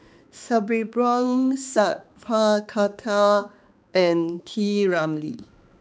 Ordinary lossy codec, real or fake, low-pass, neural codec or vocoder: none; fake; none; codec, 16 kHz, 2 kbps, X-Codec, HuBERT features, trained on balanced general audio